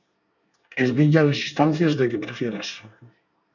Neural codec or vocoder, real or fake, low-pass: codec, 24 kHz, 1 kbps, SNAC; fake; 7.2 kHz